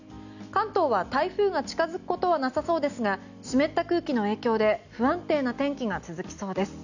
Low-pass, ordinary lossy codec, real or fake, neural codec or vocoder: 7.2 kHz; none; real; none